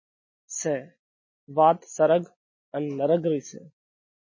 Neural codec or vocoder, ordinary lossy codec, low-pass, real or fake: none; MP3, 32 kbps; 7.2 kHz; real